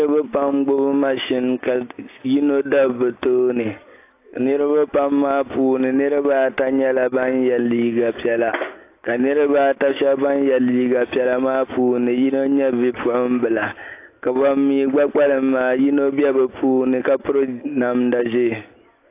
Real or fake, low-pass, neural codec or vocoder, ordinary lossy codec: real; 3.6 kHz; none; AAC, 24 kbps